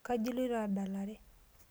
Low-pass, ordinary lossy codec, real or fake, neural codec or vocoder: none; none; real; none